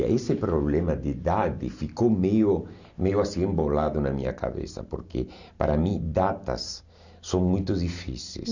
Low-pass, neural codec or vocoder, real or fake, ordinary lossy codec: 7.2 kHz; none; real; none